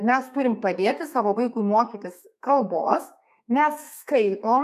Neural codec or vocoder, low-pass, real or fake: codec, 32 kHz, 1.9 kbps, SNAC; 14.4 kHz; fake